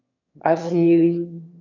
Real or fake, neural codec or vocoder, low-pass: fake; autoencoder, 22.05 kHz, a latent of 192 numbers a frame, VITS, trained on one speaker; 7.2 kHz